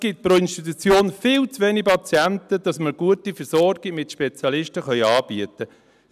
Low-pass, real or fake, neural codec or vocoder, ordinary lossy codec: 14.4 kHz; real; none; none